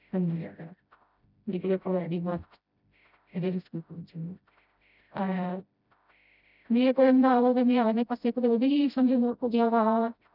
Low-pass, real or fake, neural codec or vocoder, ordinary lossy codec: 5.4 kHz; fake; codec, 16 kHz, 0.5 kbps, FreqCodec, smaller model; none